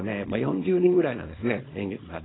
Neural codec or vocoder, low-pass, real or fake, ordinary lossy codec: codec, 24 kHz, 0.9 kbps, WavTokenizer, small release; 7.2 kHz; fake; AAC, 16 kbps